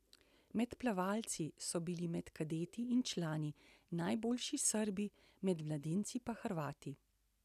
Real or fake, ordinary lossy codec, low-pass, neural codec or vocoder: real; none; 14.4 kHz; none